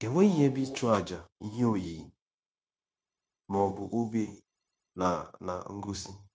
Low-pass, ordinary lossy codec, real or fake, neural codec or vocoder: none; none; fake; codec, 16 kHz, 0.9 kbps, LongCat-Audio-Codec